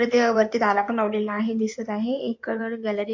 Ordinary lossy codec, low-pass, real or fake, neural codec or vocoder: MP3, 48 kbps; 7.2 kHz; fake; codec, 16 kHz in and 24 kHz out, 2.2 kbps, FireRedTTS-2 codec